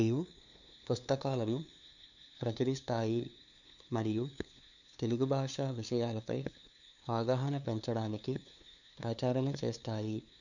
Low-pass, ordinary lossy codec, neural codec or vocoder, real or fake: 7.2 kHz; none; codec, 16 kHz, 2 kbps, FunCodec, trained on LibriTTS, 25 frames a second; fake